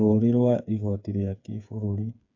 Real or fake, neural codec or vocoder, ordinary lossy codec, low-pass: fake; codec, 16 kHz, 8 kbps, FreqCodec, smaller model; AAC, 48 kbps; 7.2 kHz